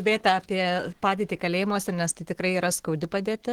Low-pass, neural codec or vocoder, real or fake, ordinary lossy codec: 19.8 kHz; autoencoder, 48 kHz, 128 numbers a frame, DAC-VAE, trained on Japanese speech; fake; Opus, 16 kbps